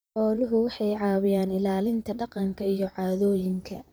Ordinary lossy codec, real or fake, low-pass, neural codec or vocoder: none; fake; none; vocoder, 44.1 kHz, 128 mel bands, Pupu-Vocoder